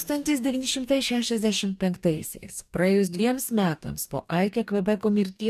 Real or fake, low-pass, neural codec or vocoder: fake; 14.4 kHz; codec, 44.1 kHz, 2.6 kbps, DAC